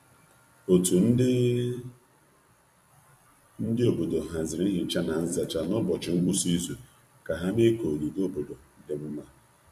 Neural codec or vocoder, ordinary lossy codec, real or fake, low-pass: vocoder, 44.1 kHz, 128 mel bands every 256 samples, BigVGAN v2; MP3, 64 kbps; fake; 14.4 kHz